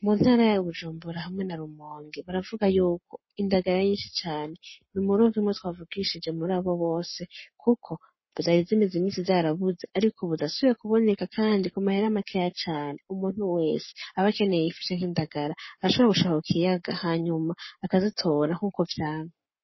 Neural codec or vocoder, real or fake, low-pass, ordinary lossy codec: codec, 44.1 kHz, 7.8 kbps, Pupu-Codec; fake; 7.2 kHz; MP3, 24 kbps